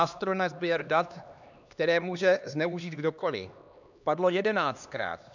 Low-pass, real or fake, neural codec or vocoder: 7.2 kHz; fake; codec, 16 kHz, 2 kbps, X-Codec, HuBERT features, trained on LibriSpeech